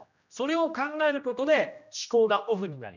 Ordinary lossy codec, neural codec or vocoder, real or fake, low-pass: none; codec, 16 kHz, 1 kbps, X-Codec, HuBERT features, trained on general audio; fake; 7.2 kHz